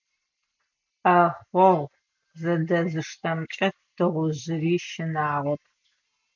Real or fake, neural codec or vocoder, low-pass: real; none; 7.2 kHz